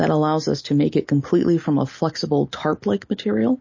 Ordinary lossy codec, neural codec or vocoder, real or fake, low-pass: MP3, 32 kbps; none; real; 7.2 kHz